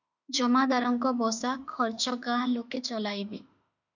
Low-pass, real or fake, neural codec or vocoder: 7.2 kHz; fake; autoencoder, 48 kHz, 32 numbers a frame, DAC-VAE, trained on Japanese speech